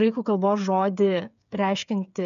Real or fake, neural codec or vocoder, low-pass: fake; codec, 16 kHz, 8 kbps, FreqCodec, smaller model; 7.2 kHz